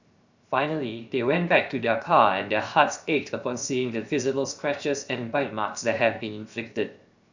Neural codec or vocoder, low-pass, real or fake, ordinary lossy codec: codec, 16 kHz, 0.7 kbps, FocalCodec; 7.2 kHz; fake; Opus, 64 kbps